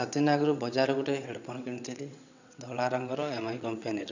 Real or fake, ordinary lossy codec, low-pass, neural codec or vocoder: fake; none; 7.2 kHz; vocoder, 22.05 kHz, 80 mel bands, WaveNeXt